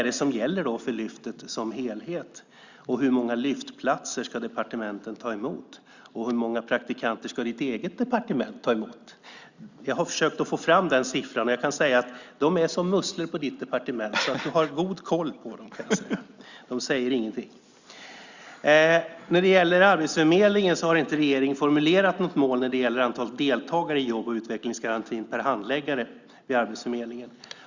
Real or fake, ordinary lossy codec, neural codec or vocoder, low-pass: real; Opus, 64 kbps; none; 7.2 kHz